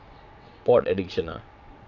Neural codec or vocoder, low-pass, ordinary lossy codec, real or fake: vocoder, 22.05 kHz, 80 mel bands, WaveNeXt; 7.2 kHz; none; fake